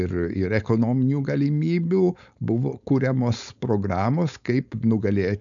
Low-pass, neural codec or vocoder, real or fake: 7.2 kHz; codec, 16 kHz, 4.8 kbps, FACodec; fake